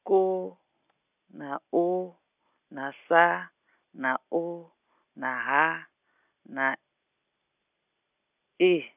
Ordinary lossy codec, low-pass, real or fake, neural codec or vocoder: none; 3.6 kHz; real; none